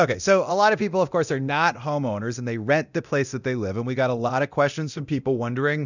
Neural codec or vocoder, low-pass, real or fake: codec, 24 kHz, 0.9 kbps, DualCodec; 7.2 kHz; fake